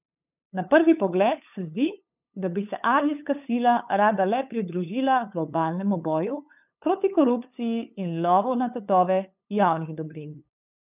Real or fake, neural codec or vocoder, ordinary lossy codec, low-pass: fake; codec, 16 kHz, 8 kbps, FunCodec, trained on LibriTTS, 25 frames a second; none; 3.6 kHz